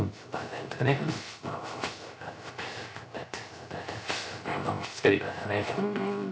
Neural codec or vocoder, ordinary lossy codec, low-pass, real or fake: codec, 16 kHz, 0.3 kbps, FocalCodec; none; none; fake